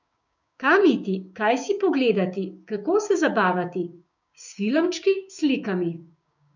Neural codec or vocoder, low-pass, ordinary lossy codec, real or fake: codec, 16 kHz, 6 kbps, DAC; 7.2 kHz; none; fake